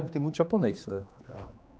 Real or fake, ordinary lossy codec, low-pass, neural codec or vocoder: fake; none; none; codec, 16 kHz, 2 kbps, X-Codec, HuBERT features, trained on general audio